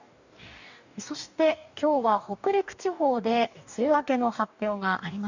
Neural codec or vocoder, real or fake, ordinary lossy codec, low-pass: codec, 44.1 kHz, 2.6 kbps, DAC; fake; none; 7.2 kHz